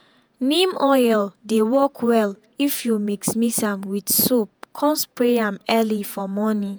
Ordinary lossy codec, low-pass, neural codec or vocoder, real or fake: none; none; vocoder, 48 kHz, 128 mel bands, Vocos; fake